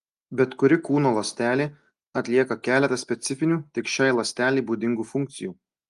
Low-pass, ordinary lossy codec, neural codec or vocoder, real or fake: 10.8 kHz; Opus, 32 kbps; none; real